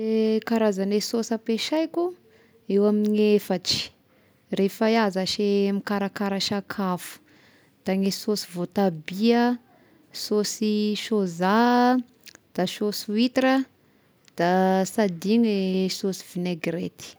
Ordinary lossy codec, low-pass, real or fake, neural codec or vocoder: none; none; real; none